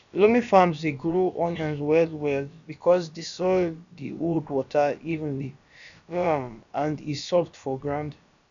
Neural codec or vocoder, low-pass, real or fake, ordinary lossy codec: codec, 16 kHz, about 1 kbps, DyCAST, with the encoder's durations; 7.2 kHz; fake; AAC, 96 kbps